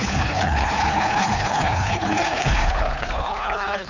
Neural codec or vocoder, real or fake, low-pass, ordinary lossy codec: codec, 24 kHz, 3 kbps, HILCodec; fake; 7.2 kHz; none